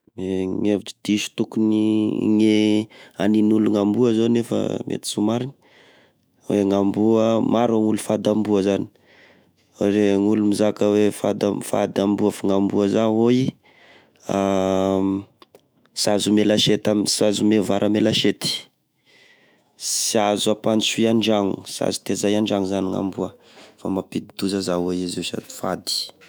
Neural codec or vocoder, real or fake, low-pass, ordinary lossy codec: autoencoder, 48 kHz, 128 numbers a frame, DAC-VAE, trained on Japanese speech; fake; none; none